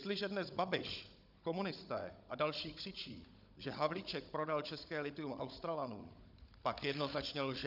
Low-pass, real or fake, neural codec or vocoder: 5.4 kHz; fake; codec, 16 kHz, 16 kbps, FunCodec, trained on Chinese and English, 50 frames a second